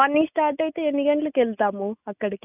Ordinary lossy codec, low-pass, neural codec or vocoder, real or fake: none; 3.6 kHz; none; real